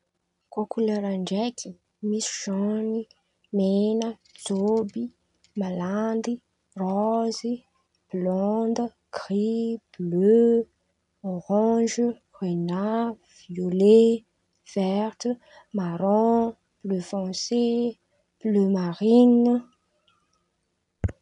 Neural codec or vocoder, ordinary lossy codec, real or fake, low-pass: none; none; real; 10.8 kHz